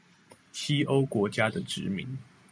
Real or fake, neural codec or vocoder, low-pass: real; none; 9.9 kHz